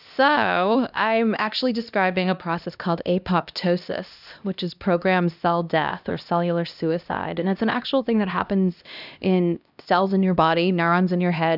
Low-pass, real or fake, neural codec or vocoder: 5.4 kHz; fake; codec, 16 kHz, 1 kbps, X-Codec, HuBERT features, trained on LibriSpeech